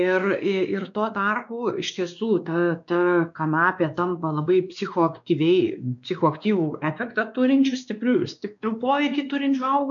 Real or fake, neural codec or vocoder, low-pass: fake; codec, 16 kHz, 2 kbps, X-Codec, WavLM features, trained on Multilingual LibriSpeech; 7.2 kHz